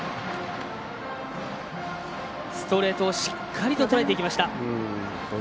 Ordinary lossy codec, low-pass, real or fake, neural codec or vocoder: none; none; real; none